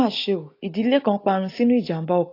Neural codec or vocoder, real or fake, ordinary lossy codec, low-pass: none; real; AAC, 32 kbps; 5.4 kHz